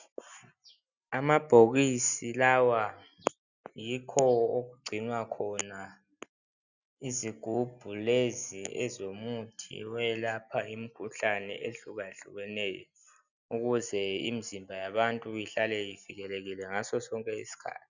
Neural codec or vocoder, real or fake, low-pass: none; real; 7.2 kHz